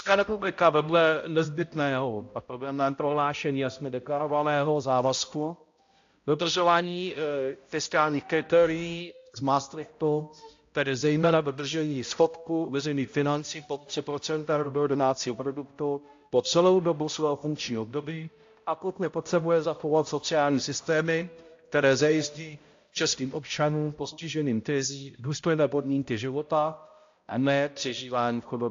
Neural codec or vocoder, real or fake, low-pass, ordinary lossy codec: codec, 16 kHz, 0.5 kbps, X-Codec, HuBERT features, trained on balanced general audio; fake; 7.2 kHz; AAC, 48 kbps